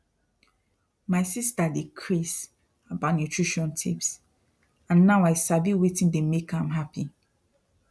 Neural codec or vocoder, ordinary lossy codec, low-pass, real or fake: none; none; none; real